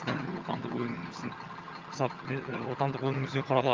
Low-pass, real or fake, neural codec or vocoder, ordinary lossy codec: 7.2 kHz; fake; vocoder, 22.05 kHz, 80 mel bands, HiFi-GAN; Opus, 32 kbps